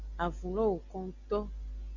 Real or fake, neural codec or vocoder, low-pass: real; none; 7.2 kHz